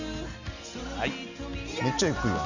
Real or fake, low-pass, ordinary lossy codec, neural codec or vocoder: real; 7.2 kHz; none; none